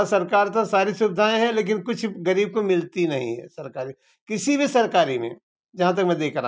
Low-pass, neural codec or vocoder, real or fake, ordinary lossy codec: none; none; real; none